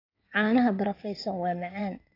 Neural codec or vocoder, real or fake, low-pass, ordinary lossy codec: codec, 16 kHz in and 24 kHz out, 2.2 kbps, FireRedTTS-2 codec; fake; 5.4 kHz; AAC, 32 kbps